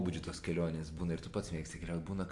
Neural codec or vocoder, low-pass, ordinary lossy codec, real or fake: none; 10.8 kHz; AAC, 64 kbps; real